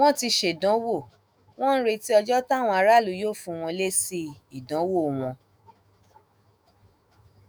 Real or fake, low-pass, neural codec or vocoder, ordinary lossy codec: fake; none; autoencoder, 48 kHz, 128 numbers a frame, DAC-VAE, trained on Japanese speech; none